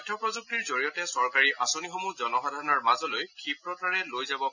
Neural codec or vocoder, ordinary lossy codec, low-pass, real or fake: none; none; 7.2 kHz; real